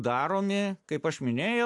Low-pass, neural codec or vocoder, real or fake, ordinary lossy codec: 10.8 kHz; none; real; AAC, 64 kbps